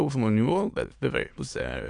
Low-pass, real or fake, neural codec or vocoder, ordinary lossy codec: 9.9 kHz; fake; autoencoder, 22.05 kHz, a latent of 192 numbers a frame, VITS, trained on many speakers; AAC, 64 kbps